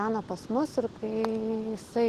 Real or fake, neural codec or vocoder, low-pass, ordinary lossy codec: real; none; 14.4 kHz; Opus, 16 kbps